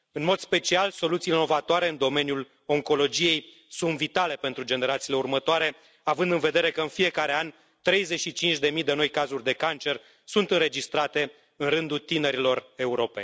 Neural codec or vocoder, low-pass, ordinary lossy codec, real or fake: none; none; none; real